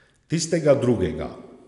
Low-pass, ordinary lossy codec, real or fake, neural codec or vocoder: 10.8 kHz; none; real; none